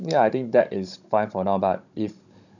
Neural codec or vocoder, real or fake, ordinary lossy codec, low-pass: codec, 16 kHz, 16 kbps, FunCodec, trained on Chinese and English, 50 frames a second; fake; none; 7.2 kHz